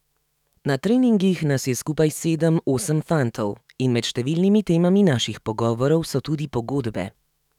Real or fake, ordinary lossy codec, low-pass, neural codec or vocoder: fake; none; 19.8 kHz; autoencoder, 48 kHz, 128 numbers a frame, DAC-VAE, trained on Japanese speech